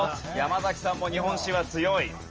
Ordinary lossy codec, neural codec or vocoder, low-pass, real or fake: Opus, 24 kbps; none; 7.2 kHz; real